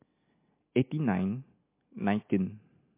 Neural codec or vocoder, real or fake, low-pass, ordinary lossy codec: none; real; 3.6 kHz; MP3, 24 kbps